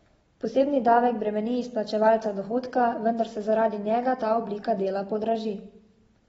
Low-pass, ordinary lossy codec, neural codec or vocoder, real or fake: 19.8 kHz; AAC, 24 kbps; none; real